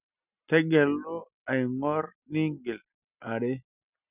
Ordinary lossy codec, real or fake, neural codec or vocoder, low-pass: none; real; none; 3.6 kHz